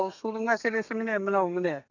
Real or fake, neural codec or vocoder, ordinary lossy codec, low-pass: fake; codec, 32 kHz, 1.9 kbps, SNAC; none; 7.2 kHz